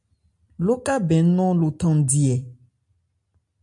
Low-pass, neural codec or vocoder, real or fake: 10.8 kHz; none; real